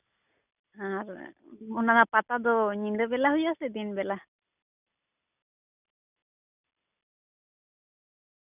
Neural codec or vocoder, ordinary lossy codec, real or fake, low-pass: none; none; real; 3.6 kHz